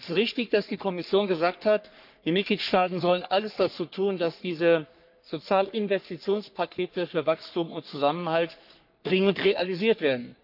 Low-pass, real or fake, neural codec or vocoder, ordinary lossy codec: 5.4 kHz; fake; codec, 44.1 kHz, 3.4 kbps, Pupu-Codec; none